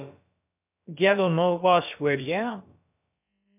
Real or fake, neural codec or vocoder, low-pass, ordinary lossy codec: fake; codec, 16 kHz, about 1 kbps, DyCAST, with the encoder's durations; 3.6 kHz; MP3, 32 kbps